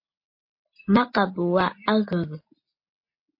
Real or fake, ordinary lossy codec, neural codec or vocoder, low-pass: real; MP3, 32 kbps; none; 5.4 kHz